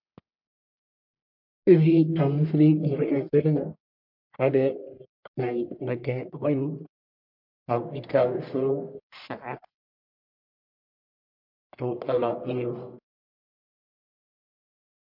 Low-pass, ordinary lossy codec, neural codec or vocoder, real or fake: 5.4 kHz; MP3, 48 kbps; codec, 44.1 kHz, 1.7 kbps, Pupu-Codec; fake